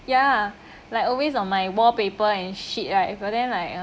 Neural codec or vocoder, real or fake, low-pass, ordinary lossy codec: none; real; none; none